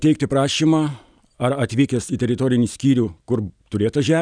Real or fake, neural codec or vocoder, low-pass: real; none; 9.9 kHz